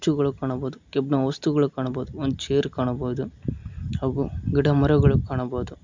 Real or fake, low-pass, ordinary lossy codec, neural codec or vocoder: real; 7.2 kHz; MP3, 64 kbps; none